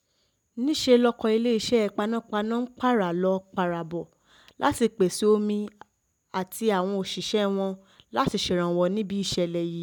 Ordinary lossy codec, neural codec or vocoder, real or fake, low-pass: none; none; real; 19.8 kHz